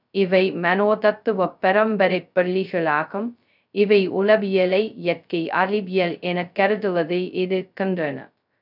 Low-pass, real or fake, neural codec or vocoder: 5.4 kHz; fake; codec, 16 kHz, 0.2 kbps, FocalCodec